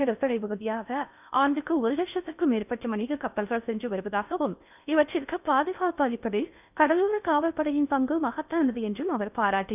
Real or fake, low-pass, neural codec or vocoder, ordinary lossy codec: fake; 3.6 kHz; codec, 16 kHz in and 24 kHz out, 0.6 kbps, FocalCodec, streaming, 4096 codes; none